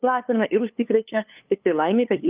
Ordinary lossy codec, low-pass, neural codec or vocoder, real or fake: Opus, 24 kbps; 3.6 kHz; codec, 16 kHz, 2 kbps, FunCodec, trained on LibriTTS, 25 frames a second; fake